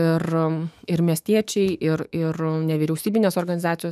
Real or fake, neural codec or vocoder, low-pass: fake; autoencoder, 48 kHz, 128 numbers a frame, DAC-VAE, trained on Japanese speech; 14.4 kHz